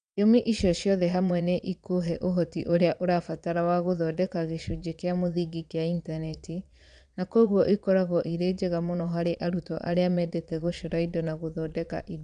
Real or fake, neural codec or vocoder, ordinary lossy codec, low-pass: fake; vocoder, 22.05 kHz, 80 mel bands, Vocos; none; 9.9 kHz